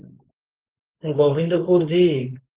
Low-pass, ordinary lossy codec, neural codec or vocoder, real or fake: 3.6 kHz; Opus, 16 kbps; codec, 16 kHz, 4.8 kbps, FACodec; fake